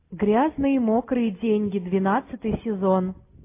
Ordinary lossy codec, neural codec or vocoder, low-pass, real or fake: AAC, 24 kbps; none; 3.6 kHz; real